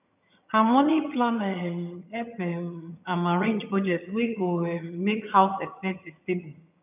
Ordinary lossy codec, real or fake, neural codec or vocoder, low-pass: none; fake; vocoder, 22.05 kHz, 80 mel bands, HiFi-GAN; 3.6 kHz